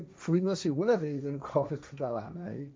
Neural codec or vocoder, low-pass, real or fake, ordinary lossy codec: codec, 16 kHz, 1.1 kbps, Voila-Tokenizer; none; fake; none